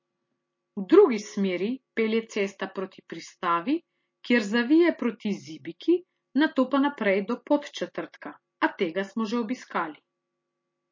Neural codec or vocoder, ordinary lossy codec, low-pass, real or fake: none; MP3, 32 kbps; 7.2 kHz; real